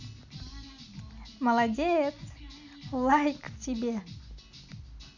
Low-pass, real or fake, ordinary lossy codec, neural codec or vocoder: 7.2 kHz; real; none; none